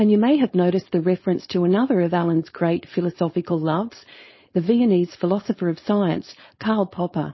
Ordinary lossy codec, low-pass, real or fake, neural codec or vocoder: MP3, 24 kbps; 7.2 kHz; fake; codec, 16 kHz, 4.8 kbps, FACodec